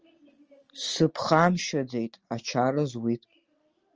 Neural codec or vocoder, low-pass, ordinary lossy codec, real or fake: none; 7.2 kHz; Opus, 24 kbps; real